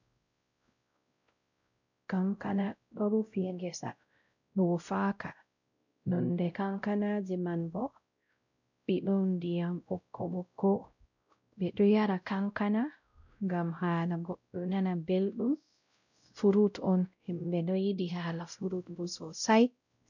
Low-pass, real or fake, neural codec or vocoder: 7.2 kHz; fake; codec, 16 kHz, 0.5 kbps, X-Codec, WavLM features, trained on Multilingual LibriSpeech